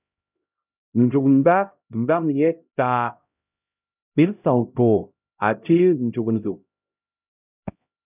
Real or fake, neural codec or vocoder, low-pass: fake; codec, 16 kHz, 0.5 kbps, X-Codec, HuBERT features, trained on LibriSpeech; 3.6 kHz